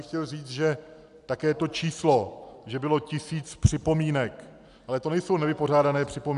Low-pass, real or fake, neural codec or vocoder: 10.8 kHz; real; none